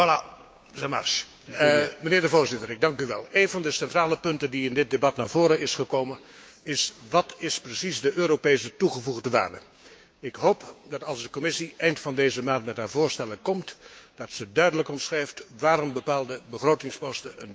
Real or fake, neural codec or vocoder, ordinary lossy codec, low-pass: fake; codec, 16 kHz, 6 kbps, DAC; none; none